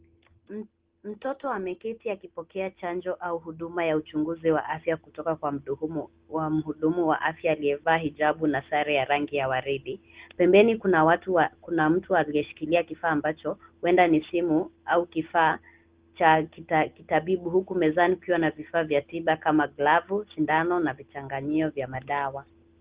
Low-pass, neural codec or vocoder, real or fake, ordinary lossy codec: 3.6 kHz; none; real; Opus, 16 kbps